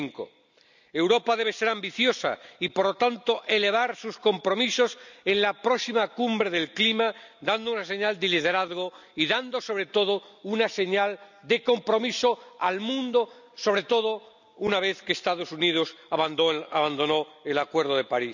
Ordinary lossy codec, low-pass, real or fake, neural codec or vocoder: none; 7.2 kHz; real; none